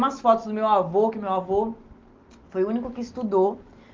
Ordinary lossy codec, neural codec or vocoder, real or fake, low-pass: Opus, 32 kbps; none; real; 7.2 kHz